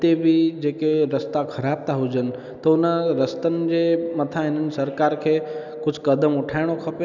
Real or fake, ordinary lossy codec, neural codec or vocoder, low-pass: real; none; none; 7.2 kHz